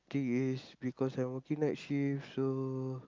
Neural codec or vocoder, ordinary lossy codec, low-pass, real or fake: none; Opus, 24 kbps; 7.2 kHz; real